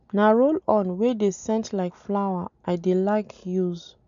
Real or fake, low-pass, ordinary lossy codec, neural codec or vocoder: real; 7.2 kHz; none; none